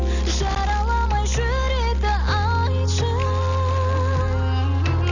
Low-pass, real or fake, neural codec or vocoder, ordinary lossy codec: 7.2 kHz; real; none; none